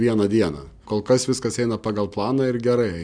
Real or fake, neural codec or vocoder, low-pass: real; none; 9.9 kHz